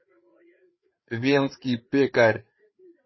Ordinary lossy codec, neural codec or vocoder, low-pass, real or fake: MP3, 24 kbps; codec, 16 kHz, 4 kbps, FreqCodec, larger model; 7.2 kHz; fake